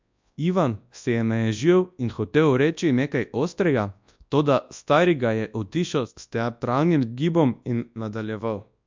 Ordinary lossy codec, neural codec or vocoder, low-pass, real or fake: MP3, 64 kbps; codec, 24 kHz, 0.9 kbps, WavTokenizer, large speech release; 7.2 kHz; fake